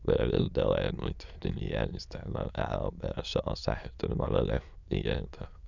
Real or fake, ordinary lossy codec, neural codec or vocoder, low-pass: fake; none; autoencoder, 22.05 kHz, a latent of 192 numbers a frame, VITS, trained on many speakers; 7.2 kHz